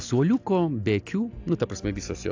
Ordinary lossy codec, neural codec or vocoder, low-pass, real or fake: AAC, 48 kbps; none; 7.2 kHz; real